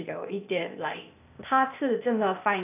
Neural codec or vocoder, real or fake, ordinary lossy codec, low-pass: codec, 16 kHz, 0.8 kbps, ZipCodec; fake; none; 3.6 kHz